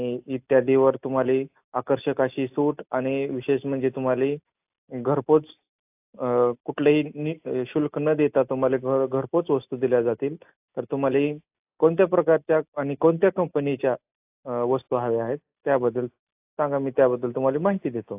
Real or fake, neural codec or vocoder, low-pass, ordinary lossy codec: real; none; 3.6 kHz; AAC, 32 kbps